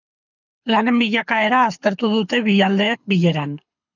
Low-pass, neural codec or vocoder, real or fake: 7.2 kHz; codec, 24 kHz, 6 kbps, HILCodec; fake